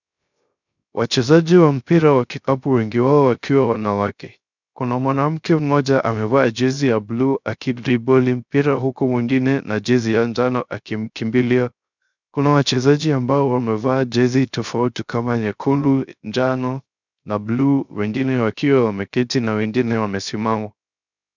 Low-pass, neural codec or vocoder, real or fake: 7.2 kHz; codec, 16 kHz, 0.3 kbps, FocalCodec; fake